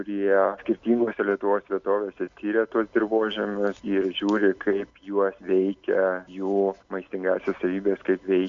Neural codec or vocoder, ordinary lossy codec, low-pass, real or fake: none; MP3, 64 kbps; 7.2 kHz; real